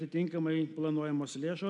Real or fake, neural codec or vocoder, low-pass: real; none; 10.8 kHz